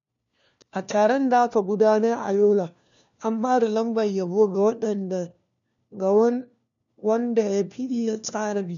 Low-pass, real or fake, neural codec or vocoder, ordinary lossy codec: 7.2 kHz; fake; codec, 16 kHz, 1 kbps, FunCodec, trained on LibriTTS, 50 frames a second; none